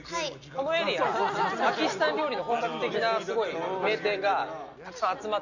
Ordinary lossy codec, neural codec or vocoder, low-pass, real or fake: none; none; 7.2 kHz; real